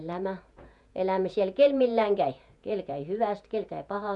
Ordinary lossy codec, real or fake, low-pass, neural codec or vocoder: none; fake; 10.8 kHz; vocoder, 48 kHz, 128 mel bands, Vocos